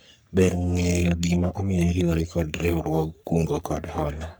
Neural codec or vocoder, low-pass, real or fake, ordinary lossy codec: codec, 44.1 kHz, 3.4 kbps, Pupu-Codec; none; fake; none